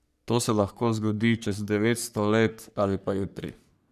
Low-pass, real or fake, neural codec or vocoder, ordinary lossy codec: 14.4 kHz; fake; codec, 44.1 kHz, 3.4 kbps, Pupu-Codec; none